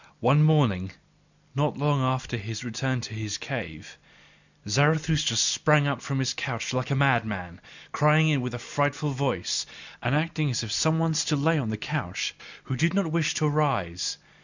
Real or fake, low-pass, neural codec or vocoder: real; 7.2 kHz; none